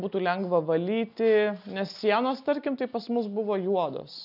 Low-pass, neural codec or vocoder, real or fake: 5.4 kHz; none; real